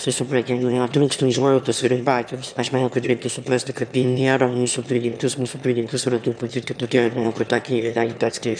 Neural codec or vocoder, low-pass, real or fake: autoencoder, 22.05 kHz, a latent of 192 numbers a frame, VITS, trained on one speaker; 9.9 kHz; fake